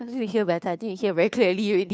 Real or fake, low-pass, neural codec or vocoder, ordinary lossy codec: fake; none; codec, 16 kHz, 2 kbps, FunCodec, trained on Chinese and English, 25 frames a second; none